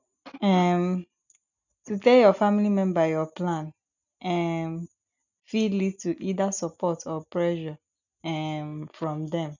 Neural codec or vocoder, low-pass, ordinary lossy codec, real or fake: none; 7.2 kHz; none; real